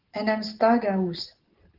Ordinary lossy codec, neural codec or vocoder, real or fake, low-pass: Opus, 16 kbps; none; real; 5.4 kHz